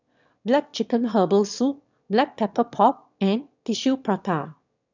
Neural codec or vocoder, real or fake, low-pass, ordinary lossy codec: autoencoder, 22.05 kHz, a latent of 192 numbers a frame, VITS, trained on one speaker; fake; 7.2 kHz; none